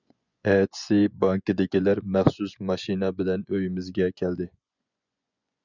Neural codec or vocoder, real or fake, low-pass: vocoder, 24 kHz, 100 mel bands, Vocos; fake; 7.2 kHz